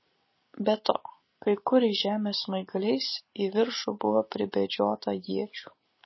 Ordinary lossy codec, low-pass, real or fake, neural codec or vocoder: MP3, 24 kbps; 7.2 kHz; real; none